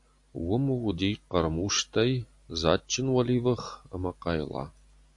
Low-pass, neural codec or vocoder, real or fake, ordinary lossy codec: 10.8 kHz; none; real; AAC, 64 kbps